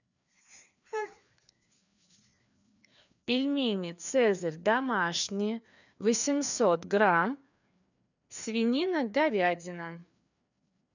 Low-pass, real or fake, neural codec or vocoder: 7.2 kHz; fake; codec, 16 kHz, 2 kbps, FreqCodec, larger model